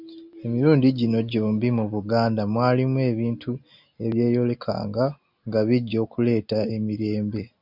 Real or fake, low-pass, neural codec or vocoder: real; 5.4 kHz; none